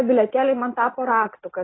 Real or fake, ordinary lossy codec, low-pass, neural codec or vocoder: real; AAC, 16 kbps; 7.2 kHz; none